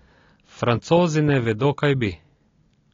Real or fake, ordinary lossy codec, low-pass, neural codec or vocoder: real; AAC, 32 kbps; 7.2 kHz; none